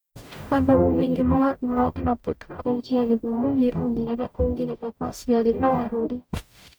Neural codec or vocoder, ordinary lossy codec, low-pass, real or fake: codec, 44.1 kHz, 0.9 kbps, DAC; none; none; fake